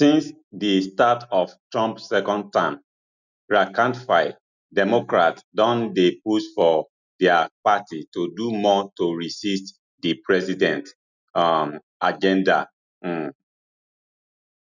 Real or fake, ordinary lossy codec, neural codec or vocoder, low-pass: real; none; none; 7.2 kHz